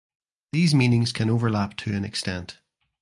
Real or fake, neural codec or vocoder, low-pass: real; none; 10.8 kHz